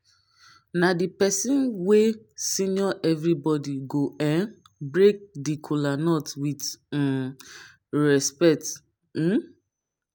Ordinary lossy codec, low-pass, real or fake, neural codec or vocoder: none; none; real; none